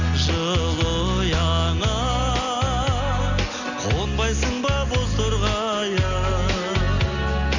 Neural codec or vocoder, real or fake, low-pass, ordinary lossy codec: none; real; 7.2 kHz; none